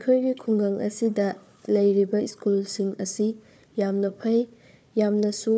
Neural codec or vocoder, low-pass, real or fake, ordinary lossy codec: codec, 16 kHz, 4 kbps, FunCodec, trained on Chinese and English, 50 frames a second; none; fake; none